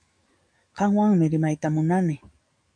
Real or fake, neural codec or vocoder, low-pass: fake; codec, 44.1 kHz, 7.8 kbps, DAC; 9.9 kHz